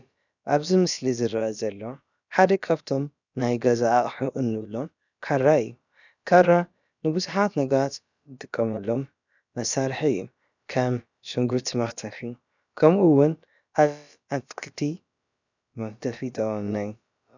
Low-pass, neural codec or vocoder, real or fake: 7.2 kHz; codec, 16 kHz, about 1 kbps, DyCAST, with the encoder's durations; fake